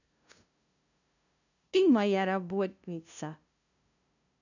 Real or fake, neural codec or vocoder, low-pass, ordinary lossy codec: fake; codec, 16 kHz, 0.5 kbps, FunCodec, trained on LibriTTS, 25 frames a second; 7.2 kHz; none